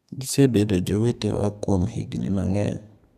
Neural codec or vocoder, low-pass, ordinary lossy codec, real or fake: codec, 32 kHz, 1.9 kbps, SNAC; 14.4 kHz; none; fake